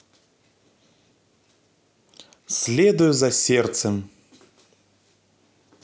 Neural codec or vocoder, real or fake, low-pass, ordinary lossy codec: none; real; none; none